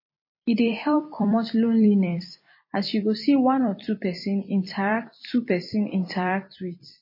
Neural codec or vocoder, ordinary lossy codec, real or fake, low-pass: vocoder, 44.1 kHz, 128 mel bands every 256 samples, BigVGAN v2; MP3, 24 kbps; fake; 5.4 kHz